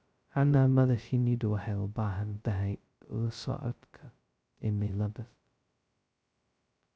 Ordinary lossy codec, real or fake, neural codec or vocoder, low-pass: none; fake; codec, 16 kHz, 0.2 kbps, FocalCodec; none